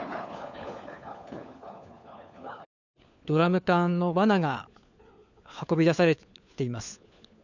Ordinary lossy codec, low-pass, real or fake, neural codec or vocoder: none; 7.2 kHz; fake; codec, 16 kHz, 4 kbps, FunCodec, trained on LibriTTS, 50 frames a second